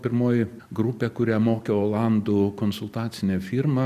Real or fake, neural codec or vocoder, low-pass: real; none; 14.4 kHz